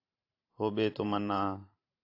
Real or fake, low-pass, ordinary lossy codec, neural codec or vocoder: fake; 5.4 kHz; AAC, 32 kbps; vocoder, 44.1 kHz, 128 mel bands every 512 samples, BigVGAN v2